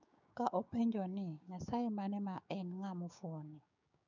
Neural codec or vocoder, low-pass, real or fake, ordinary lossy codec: codec, 16 kHz, 6 kbps, DAC; 7.2 kHz; fake; none